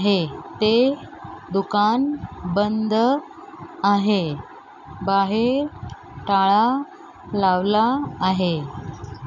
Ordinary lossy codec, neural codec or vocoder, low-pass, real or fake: none; none; 7.2 kHz; real